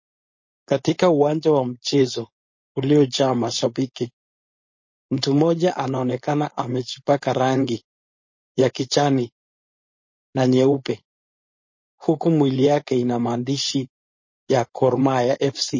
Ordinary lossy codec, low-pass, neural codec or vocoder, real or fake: MP3, 32 kbps; 7.2 kHz; codec, 16 kHz, 4.8 kbps, FACodec; fake